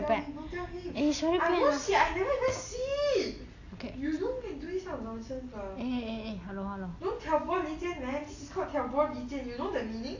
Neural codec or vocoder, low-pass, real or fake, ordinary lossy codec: none; 7.2 kHz; real; AAC, 48 kbps